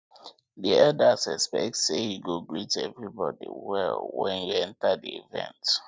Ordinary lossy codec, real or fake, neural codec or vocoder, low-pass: none; real; none; none